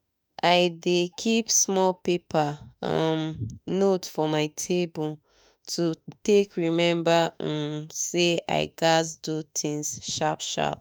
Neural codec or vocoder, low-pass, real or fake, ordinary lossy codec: autoencoder, 48 kHz, 32 numbers a frame, DAC-VAE, trained on Japanese speech; none; fake; none